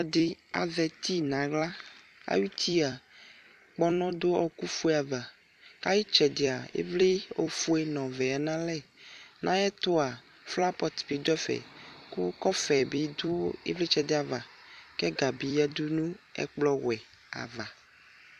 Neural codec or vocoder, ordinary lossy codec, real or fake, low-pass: vocoder, 44.1 kHz, 128 mel bands every 256 samples, BigVGAN v2; MP3, 96 kbps; fake; 14.4 kHz